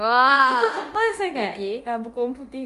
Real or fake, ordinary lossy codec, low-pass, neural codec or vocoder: fake; Opus, 32 kbps; 10.8 kHz; codec, 24 kHz, 0.9 kbps, DualCodec